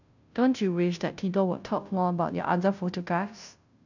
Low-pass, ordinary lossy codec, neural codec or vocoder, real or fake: 7.2 kHz; none; codec, 16 kHz, 0.5 kbps, FunCodec, trained on Chinese and English, 25 frames a second; fake